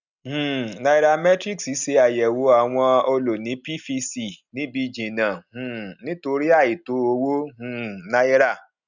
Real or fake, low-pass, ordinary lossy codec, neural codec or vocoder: real; 7.2 kHz; none; none